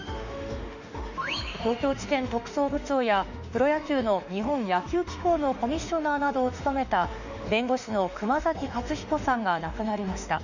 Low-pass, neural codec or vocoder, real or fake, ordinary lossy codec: 7.2 kHz; autoencoder, 48 kHz, 32 numbers a frame, DAC-VAE, trained on Japanese speech; fake; none